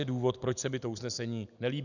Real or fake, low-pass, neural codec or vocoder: real; 7.2 kHz; none